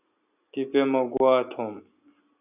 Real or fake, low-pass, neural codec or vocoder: real; 3.6 kHz; none